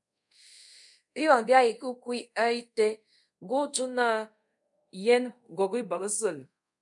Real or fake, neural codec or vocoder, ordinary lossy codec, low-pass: fake; codec, 24 kHz, 0.5 kbps, DualCodec; MP3, 96 kbps; 10.8 kHz